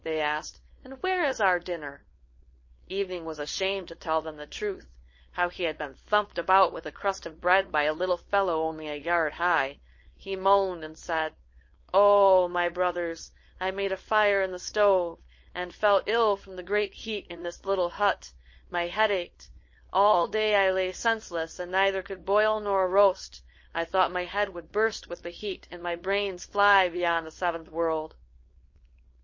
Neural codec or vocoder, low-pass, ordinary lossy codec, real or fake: codec, 16 kHz, 4.8 kbps, FACodec; 7.2 kHz; MP3, 32 kbps; fake